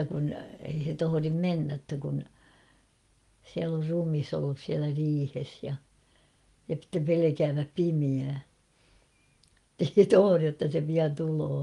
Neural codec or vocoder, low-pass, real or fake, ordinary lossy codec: none; 10.8 kHz; real; Opus, 32 kbps